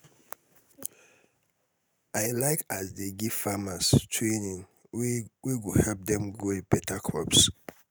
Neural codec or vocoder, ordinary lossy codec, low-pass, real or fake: none; none; none; real